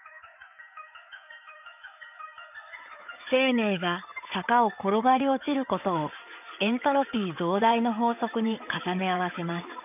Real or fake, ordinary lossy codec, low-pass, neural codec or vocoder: fake; none; 3.6 kHz; codec, 16 kHz in and 24 kHz out, 2.2 kbps, FireRedTTS-2 codec